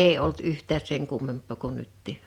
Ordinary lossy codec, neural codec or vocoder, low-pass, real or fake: Opus, 64 kbps; vocoder, 48 kHz, 128 mel bands, Vocos; 19.8 kHz; fake